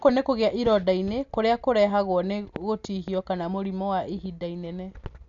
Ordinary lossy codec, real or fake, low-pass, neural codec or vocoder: none; real; 7.2 kHz; none